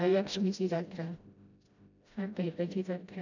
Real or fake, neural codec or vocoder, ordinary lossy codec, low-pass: fake; codec, 16 kHz, 0.5 kbps, FreqCodec, smaller model; none; 7.2 kHz